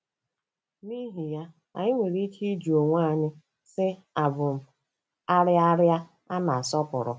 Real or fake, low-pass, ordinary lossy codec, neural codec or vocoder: real; none; none; none